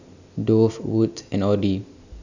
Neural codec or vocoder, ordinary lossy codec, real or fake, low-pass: none; none; real; 7.2 kHz